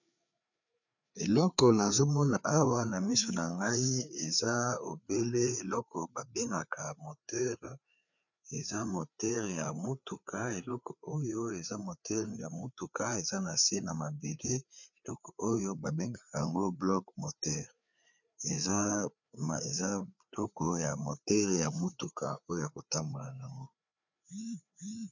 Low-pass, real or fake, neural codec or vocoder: 7.2 kHz; fake; codec, 16 kHz, 4 kbps, FreqCodec, larger model